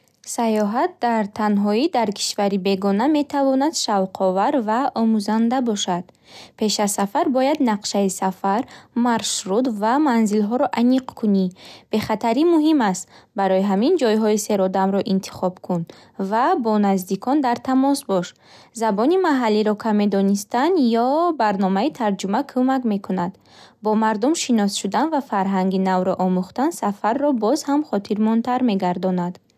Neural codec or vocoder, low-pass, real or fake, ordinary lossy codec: none; 14.4 kHz; real; none